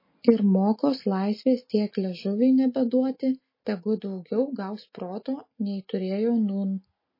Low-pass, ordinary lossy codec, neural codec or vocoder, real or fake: 5.4 kHz; MP3, 24 kbps; none; real